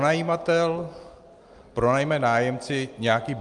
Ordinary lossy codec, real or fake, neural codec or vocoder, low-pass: Opus, 32 kbps; real; none; 10.8 kHz